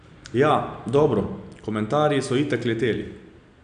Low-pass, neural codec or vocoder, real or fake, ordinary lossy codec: 9.9 kHz; none; real; none